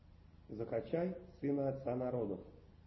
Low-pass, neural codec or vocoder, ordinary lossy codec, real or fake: 7.2 kHz; none; MP3, 24 kbps; real